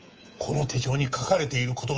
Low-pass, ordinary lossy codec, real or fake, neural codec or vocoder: 7.2 kHz; Opus, 24 kbps; fake; codec, 24 kHz, 3.1 kbps, DualCodec